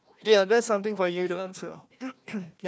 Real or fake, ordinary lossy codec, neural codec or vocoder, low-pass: fake; none; codec, 16 kHz, 1 kbps, FunCodec, trained on Chinese and English, 50 frames a second; none